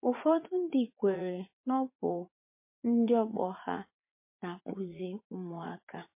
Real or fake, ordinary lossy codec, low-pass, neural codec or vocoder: fake; MP3, 24 kbps; 3.6 kHz; vocoder, 44.1 kHz, 80 mel bands, Vocos